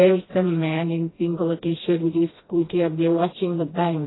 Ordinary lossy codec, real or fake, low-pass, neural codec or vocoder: AAC, 16 kbps; fake; 7.2 kHz; codec, 16 kHz, 1 kbps, FreqCodec, smaller model